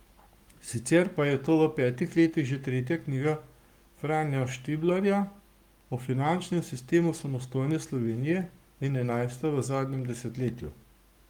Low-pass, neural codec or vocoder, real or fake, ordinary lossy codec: 19.8 kHz; codec, 44.1 kHz, 7.8 kbps, Pupu-Codec; fake; Opus, 32 kbps